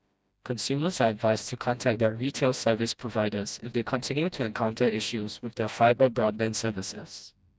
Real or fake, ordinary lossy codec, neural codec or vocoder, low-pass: fake; none; codec, 16 kHz, 1 kbps, FreqCodec, smaller model; none